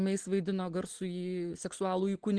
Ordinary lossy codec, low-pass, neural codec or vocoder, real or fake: Opus, 16 kbps; 9.9 kHz; none; real